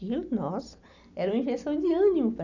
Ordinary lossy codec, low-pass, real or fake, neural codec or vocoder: none; 7.2 kHz; real; none